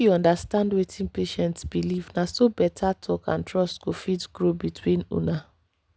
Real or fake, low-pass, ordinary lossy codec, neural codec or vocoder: real; none; none; none